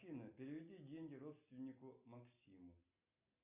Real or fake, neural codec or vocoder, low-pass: real; none; 3.6 kHz